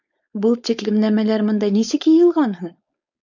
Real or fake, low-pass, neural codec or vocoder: fake; 7.2 kHz; codec, 16 kHz, 4.8 kbps, FACodec